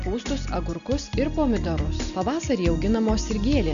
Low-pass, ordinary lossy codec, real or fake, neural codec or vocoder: 7.2 kHz; AAC, 96 kbps; real; none